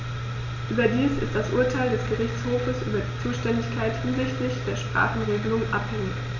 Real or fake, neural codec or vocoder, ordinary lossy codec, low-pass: real; none; none; 7.2 kHz